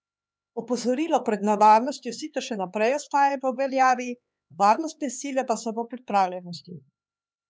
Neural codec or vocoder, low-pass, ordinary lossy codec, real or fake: codec, 16 kHz, 4 kbps, X-Codec, HuBERT features, trained on LibriSpeech; none; none; fake